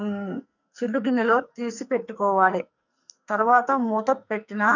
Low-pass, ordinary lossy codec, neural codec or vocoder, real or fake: 7.2 kHz; none; codec, 44.1 kHz, 2.6 kbps, SNAC; fake